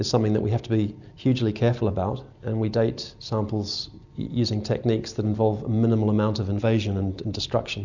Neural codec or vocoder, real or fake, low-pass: none; real; 7.2 kHz